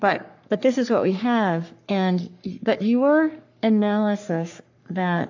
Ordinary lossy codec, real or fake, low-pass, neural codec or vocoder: AAC, 48 kbps; fake; 7.2 kHz; codec, 44.1 kHz, 3.4 kbps, Pupu-Codec